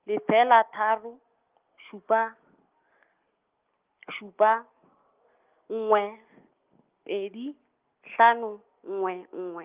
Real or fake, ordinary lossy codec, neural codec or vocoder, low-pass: real; Opus, 24 kbps; none; 3.6 kHz